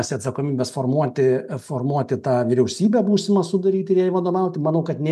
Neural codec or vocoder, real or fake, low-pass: none; real; 14.4 kHz